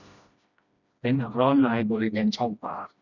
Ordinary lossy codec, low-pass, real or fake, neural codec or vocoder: none; 7.2 kHz; fake; codec, 16 kHz, 1 kbps, FreqCodec, smaller model